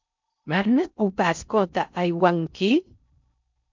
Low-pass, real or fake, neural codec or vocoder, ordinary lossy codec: 7.2 kHz; fake; codec, 16 kHz in and 24 kHz out, 0.6 kbps, FocalCodec, streaming, 2048 codes; MP3, 64 kbps